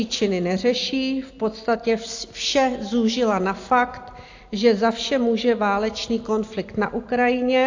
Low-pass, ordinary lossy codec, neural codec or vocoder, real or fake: 7.2 kHz; AAC, 48 kbps; none; real